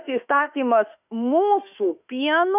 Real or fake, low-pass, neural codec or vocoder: fake; 3.6 kHz; autoencoder, 48 kHz, 32 numbers a frame, DAC-VAE, trained on Japanese speech